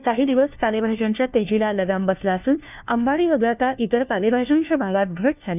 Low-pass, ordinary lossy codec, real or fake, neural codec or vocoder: 3.6 kHz; none; fake; codec, 16 kHz, 1 kbps, FunCodec, trained on LibriTTS, 50 frames a second